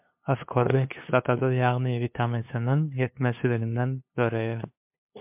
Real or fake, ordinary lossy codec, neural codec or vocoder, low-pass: fake; MP3, 32 kbps; codec, 16 kHz, 2 kbps, FunCodec, trained on LibriTTS, 25 frames a second; 3.6 kHz